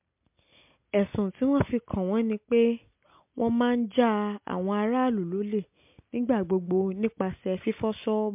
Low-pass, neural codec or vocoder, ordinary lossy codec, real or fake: 3.6 kHz; none; MP3, 32 kbps; real